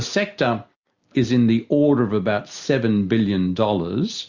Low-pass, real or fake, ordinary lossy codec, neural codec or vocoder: 7.2 kHz; real; Opus, 64 kbps; none